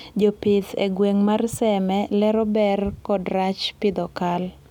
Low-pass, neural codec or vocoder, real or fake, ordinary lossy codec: 19.8 kHz; none; real; none